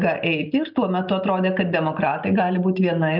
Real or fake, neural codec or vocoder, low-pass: real; none; 5.4 kHz